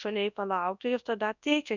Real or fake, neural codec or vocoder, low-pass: fake; codec, 24 kHz, 0.9 kbps, WavTokenizer, large speech release; 7.2 kHz